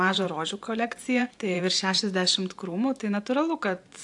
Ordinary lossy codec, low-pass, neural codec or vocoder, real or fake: AAC, 64 kbps; 10.8 kHz; vocoder, 44.1 kHz, 128 mel bands every 512 samples, BigVGAN v2; fake